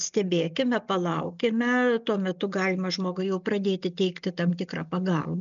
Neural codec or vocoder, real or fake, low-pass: none; real; 7.2 kHz